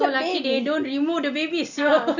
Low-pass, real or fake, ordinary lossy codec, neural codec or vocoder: 7.2 kHz; real; none; none